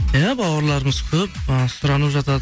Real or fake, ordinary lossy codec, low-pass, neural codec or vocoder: real; none; none; none